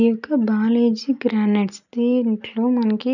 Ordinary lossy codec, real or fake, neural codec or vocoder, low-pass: none; real; none; 7.2 kHz